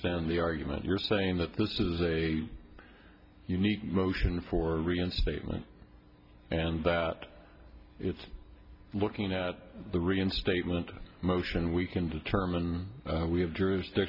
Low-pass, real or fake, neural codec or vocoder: 5.4 kHz; real; none